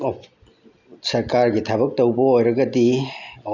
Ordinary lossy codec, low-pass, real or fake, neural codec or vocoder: none; 7.2 kHz; real; none